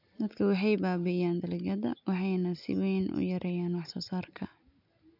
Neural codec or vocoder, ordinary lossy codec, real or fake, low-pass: none; none; real; 5.4 kHz